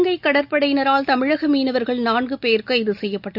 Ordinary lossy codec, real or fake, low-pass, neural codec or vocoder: none; real; 5.4 kHz; none